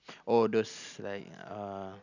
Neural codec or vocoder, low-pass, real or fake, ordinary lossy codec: none; 7.2 kHz; real; none